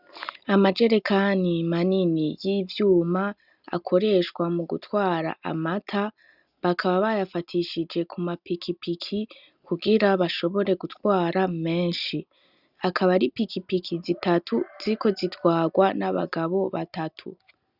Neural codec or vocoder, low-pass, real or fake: none; 5.4 kHz; real